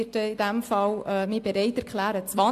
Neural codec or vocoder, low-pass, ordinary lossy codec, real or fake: none; 14.4 kHz; AAC, 64 kbps; real